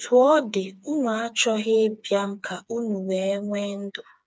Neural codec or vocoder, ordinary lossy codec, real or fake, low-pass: codec, 16 kHz, 4 kbps, FreqCodec, smaller model; none; fake; none